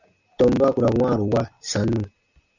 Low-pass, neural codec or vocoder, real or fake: 7.2 kHz; none; real